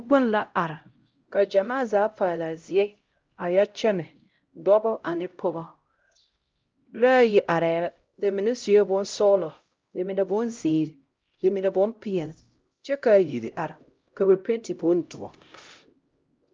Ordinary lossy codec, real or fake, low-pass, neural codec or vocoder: Opus, 24 kbps; fake; 7.2 kHz; codec, 16 kHz, 0.5 kbps, X-Codec, HuBERT features, trained on LibriSpeech